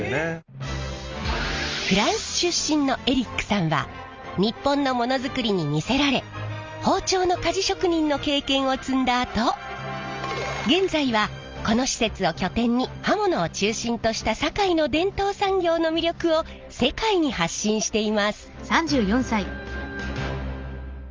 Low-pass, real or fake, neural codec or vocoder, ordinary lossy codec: 7.2 kHz; real; none; Opus, 32 kbps